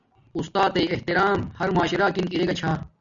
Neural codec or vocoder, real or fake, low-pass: none; real; 7.2 kHz